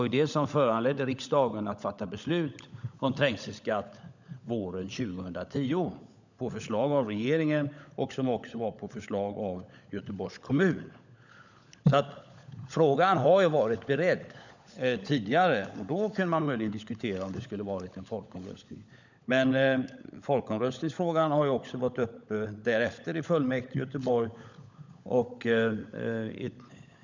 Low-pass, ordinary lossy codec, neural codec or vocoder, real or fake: 7.2 kHz; none; codec, 16 kHz, 16 kbps, FunCodec, trained on LibriTTS, 50 frames a second; fake